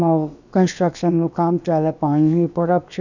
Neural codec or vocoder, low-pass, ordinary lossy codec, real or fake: codec, 16 kHz, about 1 kbps, DyCAST, with the encoder's durations; 7.2 kHz; none; fake